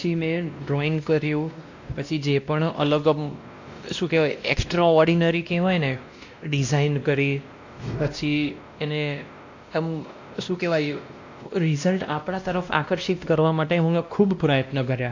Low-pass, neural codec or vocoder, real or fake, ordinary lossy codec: 7.2 kHz; codec, 16 kHz, 1 kbps, X-Codec, WavLM features, trained on Multilingual LibriSpeech; fake; AAC, 48 kbps